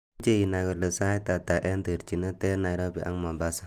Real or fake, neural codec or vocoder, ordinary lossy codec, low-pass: real; none; Opus, 32 kbps; 14.4 kHz